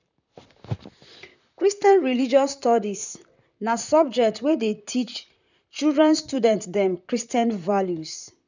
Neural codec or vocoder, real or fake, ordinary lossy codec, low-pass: vocoder, 44.1 kHz, 128 mel bands, Pupu-Vocoder; fake; none; 7.2 kHz